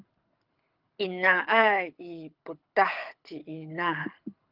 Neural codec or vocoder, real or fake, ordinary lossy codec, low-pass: codec, 24 kHz, 6 kbps, HILCodec; fake; Opus, 24 kbps; 5.4 kHz